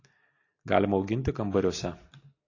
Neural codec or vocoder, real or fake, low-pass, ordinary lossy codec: none; real; 7.2 kHz; AAC, 32 kbps